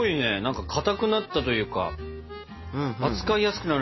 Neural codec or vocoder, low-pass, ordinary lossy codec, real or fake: none; 7.2 kHz; MP3, 24 kbps; real